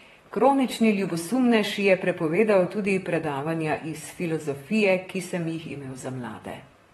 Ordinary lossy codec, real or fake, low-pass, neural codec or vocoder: AAC, 32 kbps; fake; 19.8 kHz; vocoder, 44.1 kHz, 128 mel bands, Pupu-Vocoder